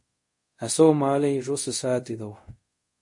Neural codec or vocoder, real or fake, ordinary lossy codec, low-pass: codec, 24 kHz, 0.5 kbps, DualCodec; fake; MP3, 48 kbps; 10.8 kHz